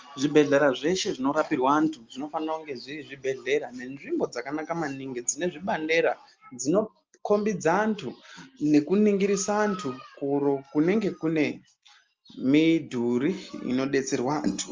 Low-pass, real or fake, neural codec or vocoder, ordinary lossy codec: 7.2 kHz; real; none; Opus, 32 kbps